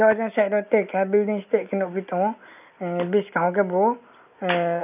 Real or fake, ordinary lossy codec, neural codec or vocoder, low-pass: real; none; none; 3.6 kHz